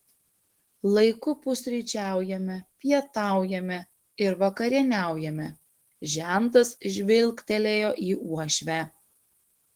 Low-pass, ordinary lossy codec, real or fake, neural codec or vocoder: 19.8 kHz; Opus, 16 kbps; fake; autoencoder, 48 kHz, 128 numbers a frame, DAC-VAE, trained on Japanese speech